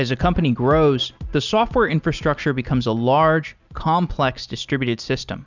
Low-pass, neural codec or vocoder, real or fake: 7.2 kHz; none; real